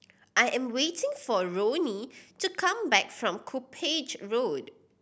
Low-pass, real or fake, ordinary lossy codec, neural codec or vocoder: none; real; none; none